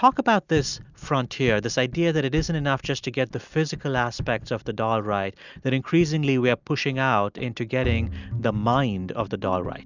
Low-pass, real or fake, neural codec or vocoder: 7.2 kHz; real; none